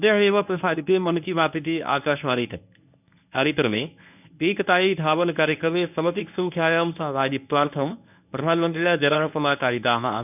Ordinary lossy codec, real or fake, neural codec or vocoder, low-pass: none; fake; codec, 24 kHz, 0.9 kbps, WavTokenizer, medium speech release version 1; 3.6 kHz